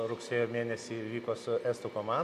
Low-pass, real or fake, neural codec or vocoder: 14.4 kHz; real; none